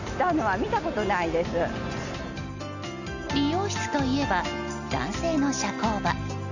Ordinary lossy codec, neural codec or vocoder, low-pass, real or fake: none; none; 7.2 kHz; real